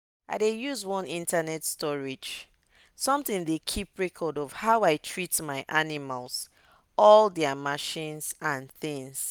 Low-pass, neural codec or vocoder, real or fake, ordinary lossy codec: none; none; real; none